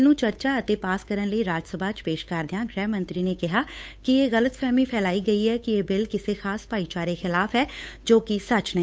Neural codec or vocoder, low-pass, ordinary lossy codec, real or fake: codec, 16 kHz, 8 kbps, FunCodec, trained on Chinese and English, 25 frames a second; none; none; fake